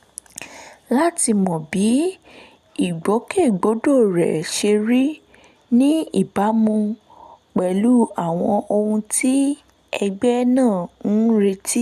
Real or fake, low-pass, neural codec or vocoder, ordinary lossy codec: real; 14.4 kHz; none; none